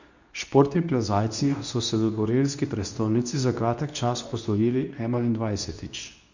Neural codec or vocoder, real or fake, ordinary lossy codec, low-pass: codec, 24 kHz, 0.9 kbps, WavTokenizer, medium speech release version 2; fake; none; 7.2 kHz